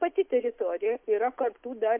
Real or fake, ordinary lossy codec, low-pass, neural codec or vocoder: real; MP3, 32 kbps; 3.6 kHz; none